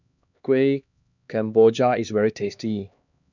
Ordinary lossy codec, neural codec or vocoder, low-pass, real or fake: none; codec, 16 kHz, 2 kbps, X-Codec, HuBERT features, trained on LibriSpeech; 7.2 kHz; fake